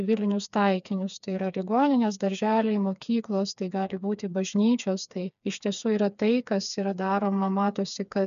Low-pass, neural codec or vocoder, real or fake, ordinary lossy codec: 7.2 kHz; codec, 16 kHz, 4 kbps, FreqCodec, smaller model; fake; MP3, 96 kbps